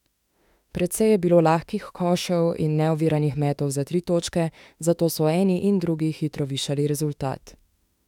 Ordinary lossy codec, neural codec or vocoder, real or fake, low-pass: none; autoencoder, 48 kHz, 32 numbers a frame, DAC-VAE, trained on Japanese speech; fake; 19.8 kHz